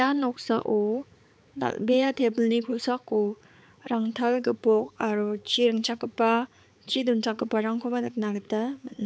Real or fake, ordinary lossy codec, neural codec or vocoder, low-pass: fake; none; codec, 16 kHz, 4 kbps, X-Codec, HuBERT features, trained on balanced general audio; none